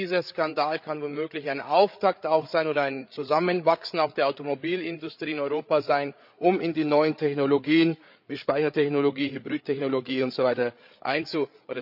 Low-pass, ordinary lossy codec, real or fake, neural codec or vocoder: 5.4 kHz; none; fake; codec, 16 kHz, 8 kbps, FreqCodec, larger model